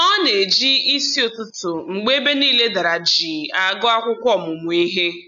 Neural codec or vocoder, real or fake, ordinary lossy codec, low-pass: none; real; none; 7.2 kHz